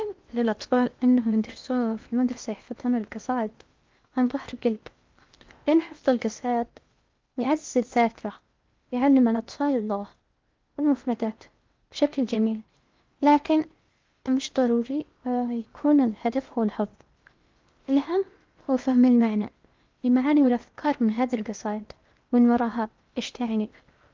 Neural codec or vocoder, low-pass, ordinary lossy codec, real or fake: codec, 16 kHz in and 24 kHz out, 0.8 kbps, FocalCodec, streaming, 65536 codes; 7.2 kHz; Opus, 32 kbps; fake